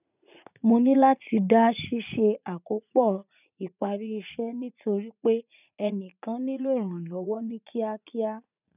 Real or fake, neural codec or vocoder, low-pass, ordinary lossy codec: fake; vocoder, 44.1 kHz, 80 mel bands, Vocos; 3.6 kHz; none